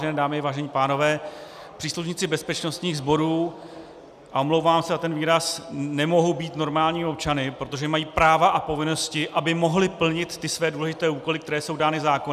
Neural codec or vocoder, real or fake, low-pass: none; real; 14.4 kHz